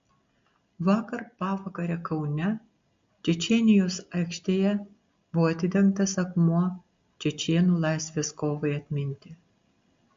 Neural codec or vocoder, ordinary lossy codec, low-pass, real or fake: none; MP3, 48 kbps; 7.2 kHz; real